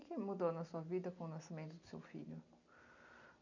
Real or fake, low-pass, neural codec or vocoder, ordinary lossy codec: real; 7.2 kHz; none; none